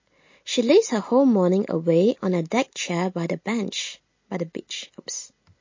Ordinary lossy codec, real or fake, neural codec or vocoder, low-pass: MP3, 32 kbps; real; none; 7.2 kHz